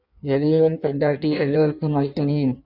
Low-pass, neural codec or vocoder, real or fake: 5.4 kHz; codec, 16 kHz in and 24 kHz out, 1.1 kbps, FireRedTTS-2 codec; fake